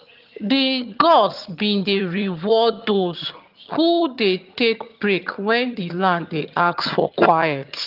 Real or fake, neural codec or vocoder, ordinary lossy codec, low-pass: fake; vocoder, 22.05 kHz, 80 mel bands, HiFi-GAN; Opus, 24 kbps; 5.4 kHz